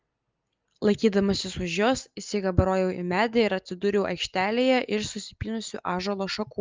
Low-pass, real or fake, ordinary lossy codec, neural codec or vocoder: 7.2 kHz; real; Opus, 32 kbps; none